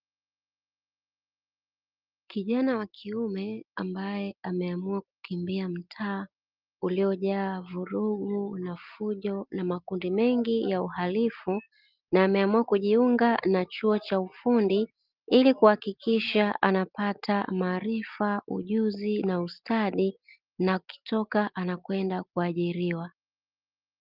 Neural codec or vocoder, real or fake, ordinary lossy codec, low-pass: none; real; Opus, 32 kbps; 5.4 kHz